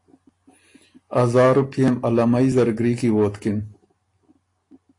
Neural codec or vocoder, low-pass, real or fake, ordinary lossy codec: none; 10.8 kHz; real; AAC, 48 kbps